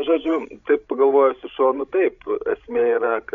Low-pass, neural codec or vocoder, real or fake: 7.2 kHz; codec, 16 kHz, 16 kbps, FreqCodec, larger model; fake